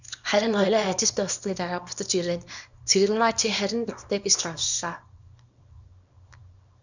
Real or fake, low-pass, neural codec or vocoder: fake; 7.2 kHz; codec, 24 kHz, 0.9 kbps, WavTokenizer, small release